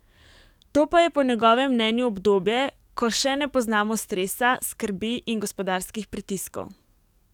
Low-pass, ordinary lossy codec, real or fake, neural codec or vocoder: 19.8 kHz; none; fake; codec, 44.1 kHz, 7.8 kbps, DAC